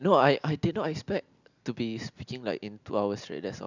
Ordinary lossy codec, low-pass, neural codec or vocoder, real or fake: none; 7.2 kHz; none; real